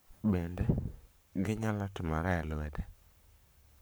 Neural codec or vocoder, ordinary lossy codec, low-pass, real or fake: codec, 44.1 kHz, 7.8 kbps, Pupu-Codec; none; none; fake